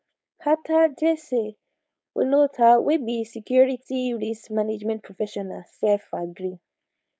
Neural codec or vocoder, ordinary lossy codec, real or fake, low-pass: codec, 16 kHz, 4.8 kbps, FACodec; none; fake; none